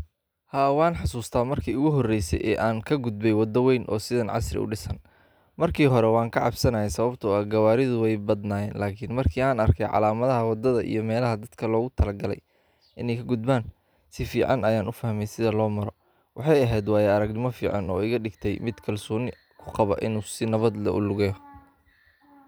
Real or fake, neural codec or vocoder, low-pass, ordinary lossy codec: real; none; none; none